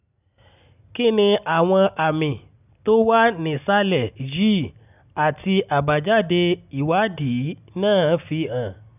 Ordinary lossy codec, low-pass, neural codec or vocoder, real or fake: none; 3.6 kHz; none; real